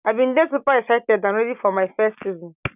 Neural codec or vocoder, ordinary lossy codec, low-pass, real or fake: none; none; 3.6 kHz; real